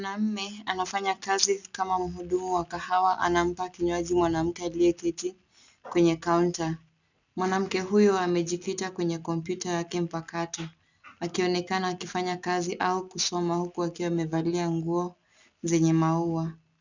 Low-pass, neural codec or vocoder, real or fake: 7.2 kHz; none; real